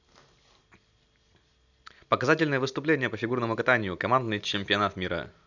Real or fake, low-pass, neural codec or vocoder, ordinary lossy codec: real; 7.2 kHz; none; none